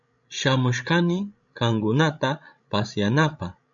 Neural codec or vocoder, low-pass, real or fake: codec, 16 kHz, 16 kbps, FreqCodec, larger model; 7.2 kHz; fake